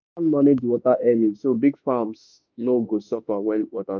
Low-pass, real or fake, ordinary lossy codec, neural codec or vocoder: 7.2 kHz; fake; none; autoencoder, 48 kHz, 32 numbers a frame, DAC-VAE, trained on Japanese speech